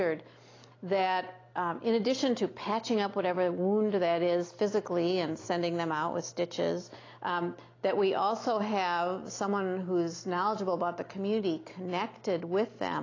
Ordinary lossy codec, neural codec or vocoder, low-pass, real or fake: AAC, 32 kbps; none; 7.2 kHz; real